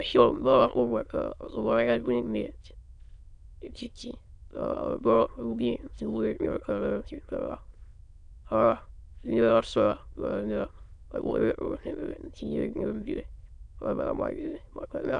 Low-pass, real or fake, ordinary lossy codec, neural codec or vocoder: 9.9 kHz; fake; none; autoencoder, 22.05 kHz, a latent of 192 numbers a frame, VITS, trained on many speakers